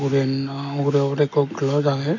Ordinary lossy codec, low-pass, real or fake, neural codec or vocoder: none; 7.2 kHz; real; none